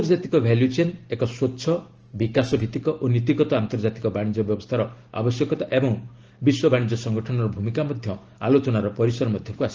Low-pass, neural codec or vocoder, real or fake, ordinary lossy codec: 7.2 kHz; none; real; Opus, 16 kbps